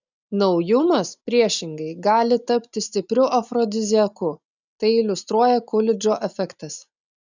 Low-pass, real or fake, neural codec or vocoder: 7.2 kHz; real; none